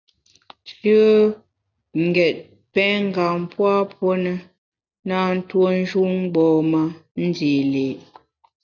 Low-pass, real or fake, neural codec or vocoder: 7.2 kHz; real; none